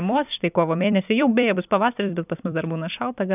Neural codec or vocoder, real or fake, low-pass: vocoder, 44.1 kHz, 128 mel bands every 256 samples, BigVGAN v2; fake; 3.6 kHz